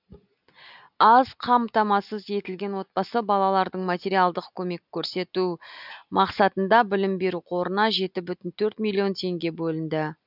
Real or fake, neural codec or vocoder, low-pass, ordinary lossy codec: real; none; 5.4 kHz; none